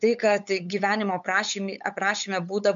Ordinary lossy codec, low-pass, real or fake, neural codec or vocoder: MP3, 64 kbps; 7.2 kHz; fake; codec, 16 kHz, 8 kbps, FunCodec, trained on LibriTTS, 25 frames a second